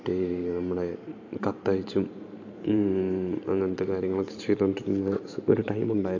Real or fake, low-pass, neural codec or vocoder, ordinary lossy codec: fake; 7.2 kHz; vocoder, 44.1 kHz, 128 mel bands every 512 samples, BigVGAN v2; AAC, 48 kbps